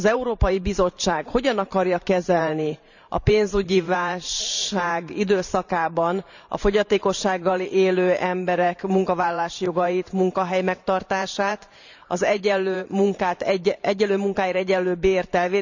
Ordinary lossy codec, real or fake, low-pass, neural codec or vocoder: none; fake; 7.2 kHz; vocoder, 44.1 kHz, 128 mel bands every 512 samples, BigVGAN v2